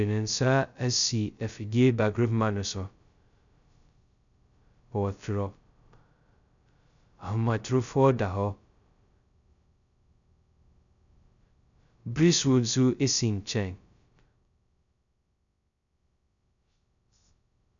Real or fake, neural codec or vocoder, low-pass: fake; codec, 16 kHz, 0.2 kbps, FocalCodec; 7.2 kHz